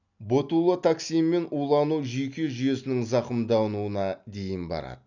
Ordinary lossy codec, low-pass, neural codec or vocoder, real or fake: none; 7.2 kHz; none; real